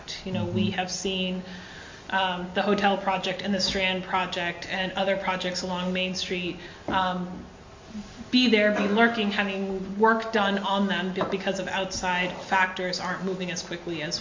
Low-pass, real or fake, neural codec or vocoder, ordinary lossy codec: 7.2 kHz; real; none; MP3, 48 kbps